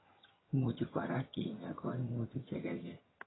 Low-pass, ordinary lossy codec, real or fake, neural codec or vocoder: 7.2 kHz; AAC, 16 kbps; fake; vocoder, 22.05 kHz, 80 mel bands, HiFi-GAN